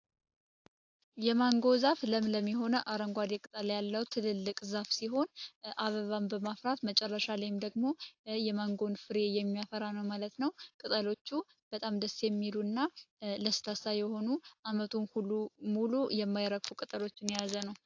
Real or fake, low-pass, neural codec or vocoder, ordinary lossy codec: real; 7.2 kHz; none; AAC, 48 kbps